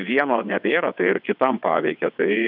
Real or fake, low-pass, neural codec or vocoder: fake; 5.4 kHz; vocoder, 22.05 kHz, 80 mel bands, WaveNeXt